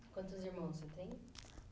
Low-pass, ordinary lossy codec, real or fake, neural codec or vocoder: none; none; real; none